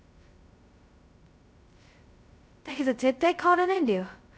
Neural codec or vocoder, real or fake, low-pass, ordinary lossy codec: codec, 16 kHz, 0.2 kbps, FocalCodec; fake; none; none